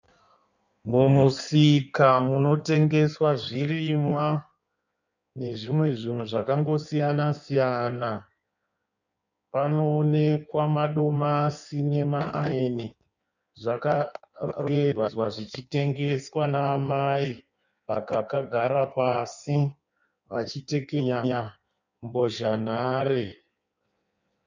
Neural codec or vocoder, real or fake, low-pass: codec, 16 kHz in and 24 kHz out, 1.1 kbps, FireRedTTS-2 codec; fake; 7.2 kHz